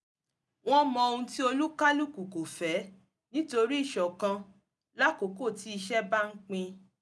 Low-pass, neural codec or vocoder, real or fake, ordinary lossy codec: none; none; real; none